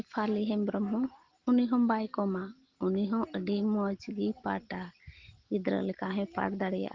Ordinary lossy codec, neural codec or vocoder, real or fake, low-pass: Opus, 16 kbps; none; real; 7.2 kHz